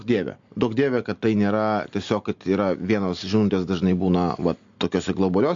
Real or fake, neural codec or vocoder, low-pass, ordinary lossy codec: real; none; 7.2 kHz; AAC, 64 kbps